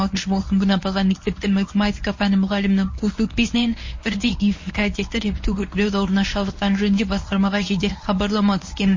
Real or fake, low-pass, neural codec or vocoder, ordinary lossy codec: fake; 7.2 kHz; codec, 24 kHz, 0.9 kbps, WavTokenizer, medium speech release version 1; MP3, 32 kbps